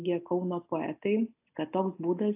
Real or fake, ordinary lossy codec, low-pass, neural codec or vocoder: real; AAC, 32 kbps; 3.6 kHz; none